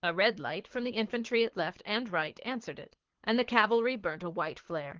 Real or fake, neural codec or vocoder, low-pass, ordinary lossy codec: fake; codec, 24 kHz, 6 kbps, HILCodec; 7.2 kHz; Opus, 16 kbps